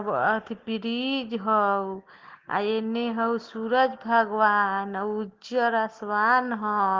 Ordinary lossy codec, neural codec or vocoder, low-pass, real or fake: Opus, 16 kbps; none; 7.2 kHz; real